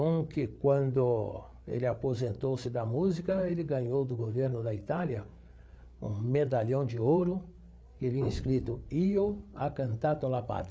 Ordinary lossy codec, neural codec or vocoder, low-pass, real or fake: none; codec, 16 kHz, 8 kbps, FreqCodec, larger model; none; fake